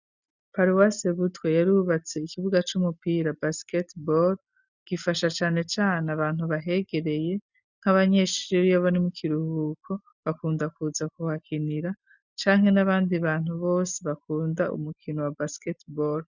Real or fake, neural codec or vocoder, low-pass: real; none; 7.2 kHz